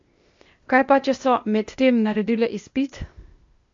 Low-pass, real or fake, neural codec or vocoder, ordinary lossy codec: 7.2 kHz; fake; codec, 16 kHz, 0.8 kbps, ZipCodec; MP3, 48 kbps